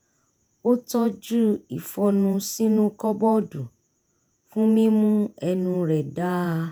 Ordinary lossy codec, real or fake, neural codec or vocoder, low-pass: none; fake; vocoder, 48 kHz, 128 mel bands, Vocos; none